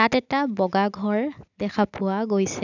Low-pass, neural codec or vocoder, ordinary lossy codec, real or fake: 7.2 kHz; none; none; real